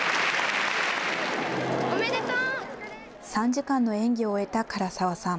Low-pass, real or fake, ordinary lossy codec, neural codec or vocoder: none; real; none; none